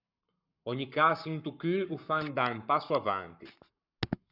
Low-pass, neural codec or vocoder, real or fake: 5.4 kHz; codec, 44.1 kHz, 7.8 kbps, Pupu-Codec; fake